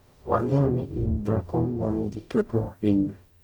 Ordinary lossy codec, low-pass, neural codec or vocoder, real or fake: none; 19.8 kHz; codec, 44.1 kHz, 0.9 kbps, DAC; fake